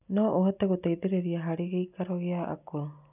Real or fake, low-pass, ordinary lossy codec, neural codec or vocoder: real; 3.6 kHz; none; none